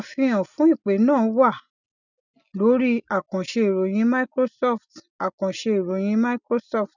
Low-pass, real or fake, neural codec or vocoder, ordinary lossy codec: 7.2 kHz; real; none; none